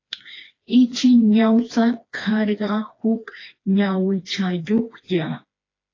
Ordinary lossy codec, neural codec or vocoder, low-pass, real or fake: AAC, 32 kbps; codec, 16 kHz, 2 kbps, FreqCodec, smaller model; 7.2 kHz; fake